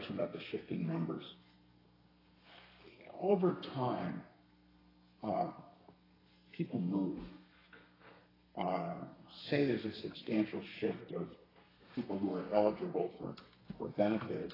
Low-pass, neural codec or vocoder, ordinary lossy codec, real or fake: 5.4 kHz; codec, 32 kHz, 1.9 kbps, SNAC; AAC, 24 kbps; fake